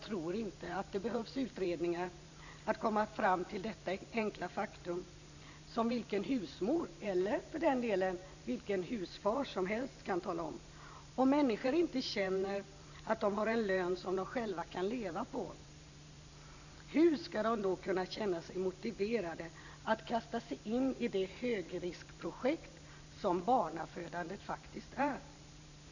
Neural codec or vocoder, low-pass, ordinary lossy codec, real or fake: vocoder, 44.1 kHz, 128 mel bands every 512 samples, BigVGAN v2; 7.2 kHz; none; fake